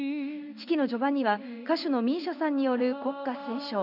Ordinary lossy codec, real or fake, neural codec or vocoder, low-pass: none; fake; codec, 16 kHz in and 24 kHz out, 1 kbps, XY-Tokenizer; 5.4 kHz